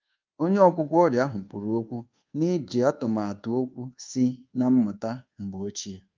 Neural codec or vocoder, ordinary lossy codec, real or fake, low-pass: codec, 24 kHz, 1.2 kbps, DualCodec; Opus, 24 kbps; fake; 7.2 kHz